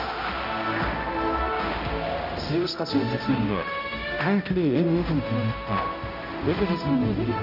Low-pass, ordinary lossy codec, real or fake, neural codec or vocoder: 5.4 kHz; none; fake; codec, 16 kHz, 0.5 kbps, X-Codec, HuBERT features, trained on balanced general audio